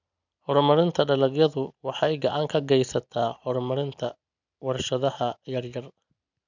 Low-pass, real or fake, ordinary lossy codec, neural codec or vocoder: 7.2 kHz; real; none; none